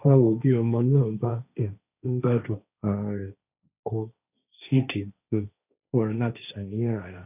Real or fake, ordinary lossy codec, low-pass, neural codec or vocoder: fake; none; 3.6 kHz; codec, 16 kHz, 1.1 kbps, Voila-Tokenizer